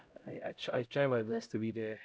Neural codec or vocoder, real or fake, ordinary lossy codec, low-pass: codec, 16 kHz, 0.5 kbps, X-Codec, HuBERT features, trained on LibriSpeech; fake; none; none